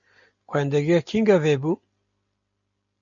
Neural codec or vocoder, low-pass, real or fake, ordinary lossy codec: none; 7.2 kHz; real; MP3, 64 kbps